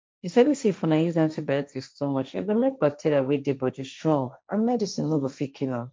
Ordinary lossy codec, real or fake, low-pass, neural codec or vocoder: none; fake; none; codec, 16 kHz, 1.1 kbps, Voila-Tokenizer